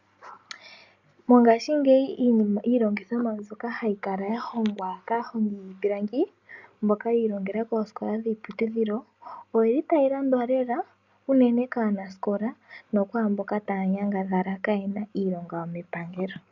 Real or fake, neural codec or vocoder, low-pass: real; none; 7.2 kHz